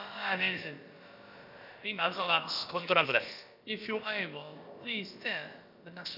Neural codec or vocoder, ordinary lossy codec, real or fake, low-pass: codec, 16 kHz, about 1 kbps, DyCAST, with the encoder's durations; none; fake; 5.4 kHz